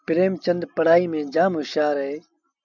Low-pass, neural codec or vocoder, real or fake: 7.2 kHz; none; real